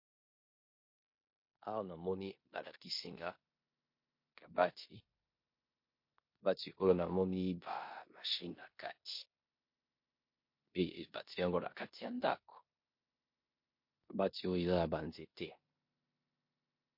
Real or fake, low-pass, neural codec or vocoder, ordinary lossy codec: fake; 5.4 kHz; codec, 16 kHz in and 24 kHz out, 0.9 kbps, LongCat-Audio-Codec, four codebook decoder; MP3, 32 kbps